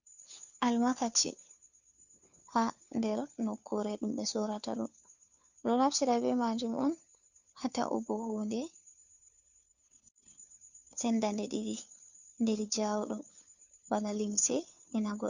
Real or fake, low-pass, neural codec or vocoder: fake; 7.2 kHz; codec, 16 kHz, 2 kbps, FunCodec, trained on Chinese and English, 25 frames a second